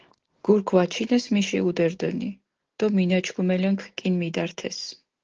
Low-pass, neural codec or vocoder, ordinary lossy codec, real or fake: 7.2 kHz; none; Opus, 16 kbps; real